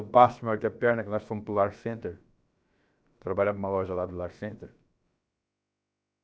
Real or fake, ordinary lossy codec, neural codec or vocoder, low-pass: fake; none; codec, 16 kHz, about 1 kbps, DyCAST, with the encoder's durations; none